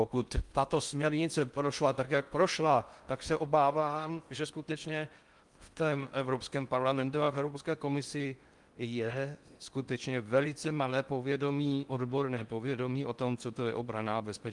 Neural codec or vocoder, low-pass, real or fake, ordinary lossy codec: codec, 16 kHz in and 24 kHz out, 0.6 kbps, FocalCodec, streaming, 4096 codes; 10.8 kHz; fake; Opus, 32 kbps